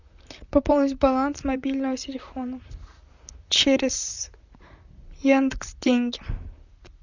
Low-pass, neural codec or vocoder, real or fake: 7.2 kHz; vocoder, 44.1 kHz, 128 mel bands, Pupu-Vocoder; fake